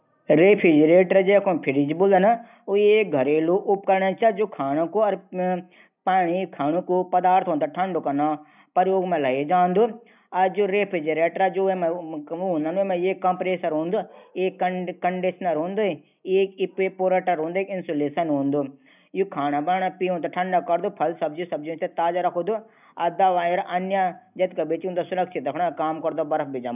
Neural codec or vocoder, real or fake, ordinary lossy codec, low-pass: none; real; none; 3.6 kHz